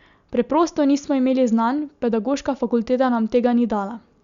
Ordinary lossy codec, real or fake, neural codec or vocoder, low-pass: Opus, 64 kbps; real; none; 7.2 kHz